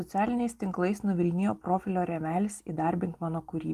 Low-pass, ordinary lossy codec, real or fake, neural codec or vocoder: 14.4 kHz; Opus, 24 kbps; real; none